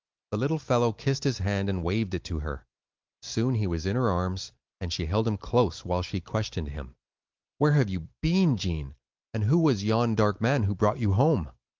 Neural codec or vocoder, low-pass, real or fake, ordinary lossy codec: none; 7.2 kHz; real; Opus, 24 kbps